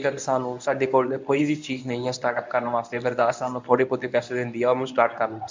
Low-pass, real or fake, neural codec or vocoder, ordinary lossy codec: 7.2 kHz; fake; codec, 24 kHz, 0.9 kbps, WavTokenizer, medium speech release version 1; MP3, 64 kbps